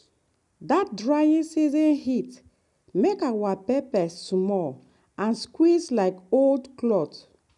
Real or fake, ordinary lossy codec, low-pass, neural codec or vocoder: real; none; 10.8 kHz; none